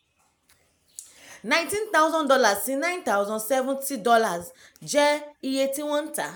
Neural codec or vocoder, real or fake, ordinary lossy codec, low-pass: none; real; none; none